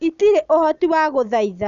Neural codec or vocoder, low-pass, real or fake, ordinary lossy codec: none; 7.2 kHz; real; none